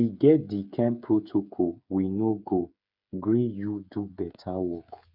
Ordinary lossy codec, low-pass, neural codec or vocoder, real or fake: none; 5.4 kHz; codec, 16 kHz, 8 kbps, FreqCodec, smaller model; fake